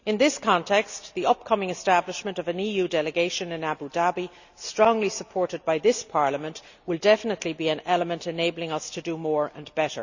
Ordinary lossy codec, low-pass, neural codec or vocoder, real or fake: none; 7.2 kHz; none; real